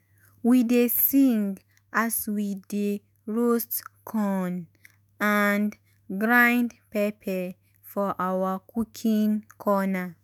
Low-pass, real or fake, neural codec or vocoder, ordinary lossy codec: none; fake; autoencoder, 48 kHz, 128 numbers a frame, DAC-VAE, trained on Japanese speech; none